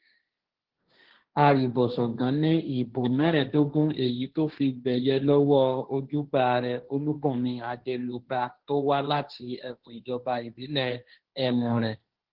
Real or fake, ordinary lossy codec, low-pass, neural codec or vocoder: fake; Opus, 16 kbps; 5.4 kHz; codec, 16 kHz, 1.1 kbps, Voila-Tokenizer